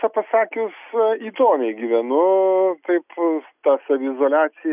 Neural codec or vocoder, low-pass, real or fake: none; 3.6 kHz; real